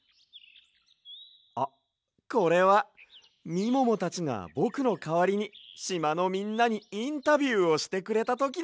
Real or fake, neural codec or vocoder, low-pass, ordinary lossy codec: real; none; none; none